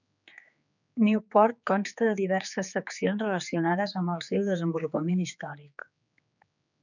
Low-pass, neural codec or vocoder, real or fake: 7.2 kHz; codec, 16 kHz, 4 kbps, X-Codec, HuBERT features, trained on general audio; fake